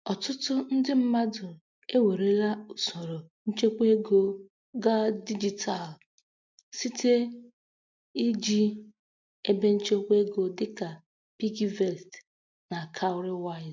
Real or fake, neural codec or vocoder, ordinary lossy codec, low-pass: real; none; MP3, 64 kbps; 7.2 kHz